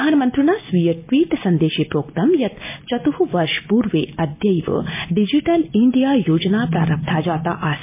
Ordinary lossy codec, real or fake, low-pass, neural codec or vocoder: MP3, 24 kbps; real; 3.6 kHz; none